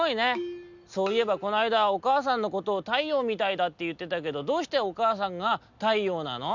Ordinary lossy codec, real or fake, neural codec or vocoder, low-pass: none; real; none; 7.2 kHz